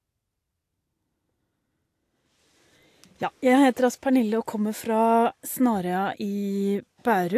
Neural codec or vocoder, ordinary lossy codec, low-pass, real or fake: none; AAC, 64 kbps; 14.4 kHz; real